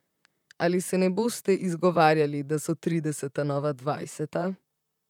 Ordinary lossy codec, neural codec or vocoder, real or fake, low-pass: none; vocoder, 44.1 kHz, 128 mel bands, Pupu-Vocoder; fake; 19.8 kHz